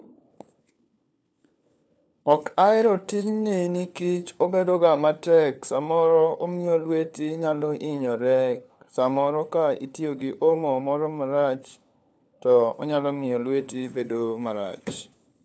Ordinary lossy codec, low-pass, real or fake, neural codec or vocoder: none; none; fake; codec, 16 kHz, 4 kbps, FunCodec, trained on LibriTTS, 50 frames a second